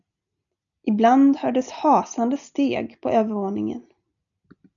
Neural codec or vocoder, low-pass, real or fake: none; 7.2 kHz; real